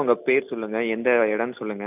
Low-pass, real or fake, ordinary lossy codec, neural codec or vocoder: 3.6 kHz; real; none; none